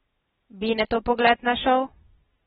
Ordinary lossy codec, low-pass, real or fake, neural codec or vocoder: AAC, 16 kbps; 9.9 kHz; real; none